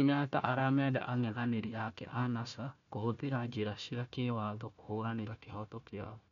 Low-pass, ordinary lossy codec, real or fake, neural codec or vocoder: 7.2 kHz; none; fake; codec, 16 kHz, 1 kbps, FunCodec, trained on Chinese and English, 50 frames a second